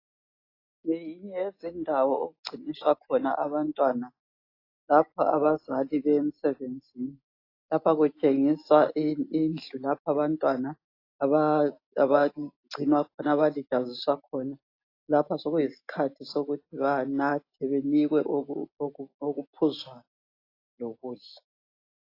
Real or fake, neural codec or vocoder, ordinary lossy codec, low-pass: real; none; AAC, 32 kbps; 5.4 kHz